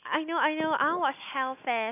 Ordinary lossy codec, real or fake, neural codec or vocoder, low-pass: AAC, 32 kbps; real; none; 3.6 kHz